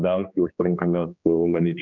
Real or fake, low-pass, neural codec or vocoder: fake; 7.2 kHz; codec, 16 kHz, 2 kbps, X-Codec, HuBERT features, trained on general audio